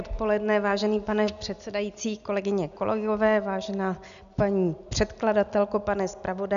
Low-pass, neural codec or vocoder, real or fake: 7.2 kHz; none; real